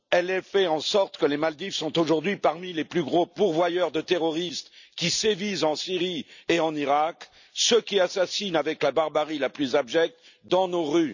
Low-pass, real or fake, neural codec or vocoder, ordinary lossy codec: 7.2 kHz; real; none; none